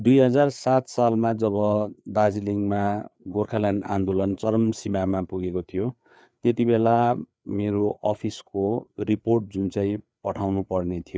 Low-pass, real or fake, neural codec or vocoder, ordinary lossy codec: none; fake; codec, 16 kHz, 4 kbps, FreqCodec, larger model; none